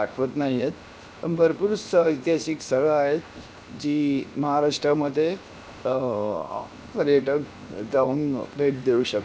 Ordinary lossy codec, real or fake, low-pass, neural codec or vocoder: none; fake; none; codec, 16 kHz, 0.7 kbps, FocalCodec